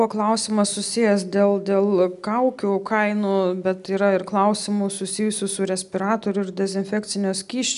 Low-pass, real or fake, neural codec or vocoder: 10.8 kHz; real; none